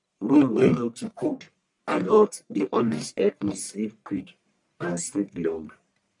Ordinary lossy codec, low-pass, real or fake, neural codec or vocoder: none; 10.8 kHz; fake; codec, 44.1 kHz, 1.7 kbps, Pupu-Codec